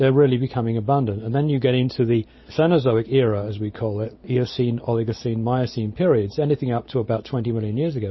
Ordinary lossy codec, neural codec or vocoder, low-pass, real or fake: MP3, 24 kbps; none; 7.2 kHz; real